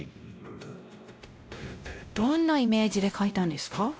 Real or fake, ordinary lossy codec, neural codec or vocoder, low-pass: fake; none; codec, 16 kHz, 0.5 kbps, X-Codec, WavLM features, trained on Multilingual LibriSpeech; none